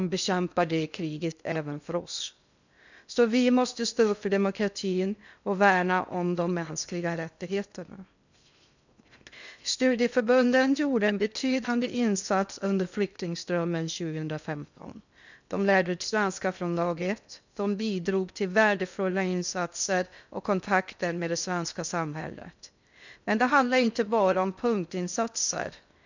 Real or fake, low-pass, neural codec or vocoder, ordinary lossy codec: fake; 7.2 kHz; codec, 16 kHz in and 24 kHz out, 0.6 kbps, FocalCodec, streaming, 2048 codes; none